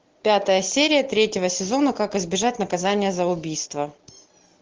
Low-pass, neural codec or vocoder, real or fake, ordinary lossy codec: 7.2 kHz; none; real; Opus, 16 kbps